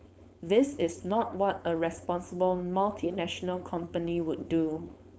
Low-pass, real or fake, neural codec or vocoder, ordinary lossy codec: none; fake; codec, 16 kHz, 4.8 kbps, FACodec; none